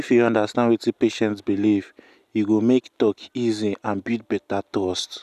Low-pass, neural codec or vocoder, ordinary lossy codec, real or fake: 14.4 kHz; none; none; real